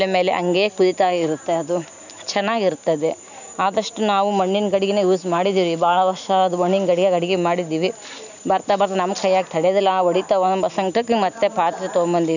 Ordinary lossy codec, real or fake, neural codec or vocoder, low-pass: none; real; none; 7.2 kHz